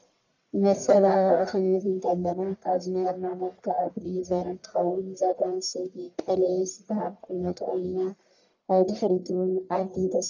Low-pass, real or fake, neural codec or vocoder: 7.2 kHz; fake; codec, 44.1 kHz, 1.7 kbps, Pupu-Codec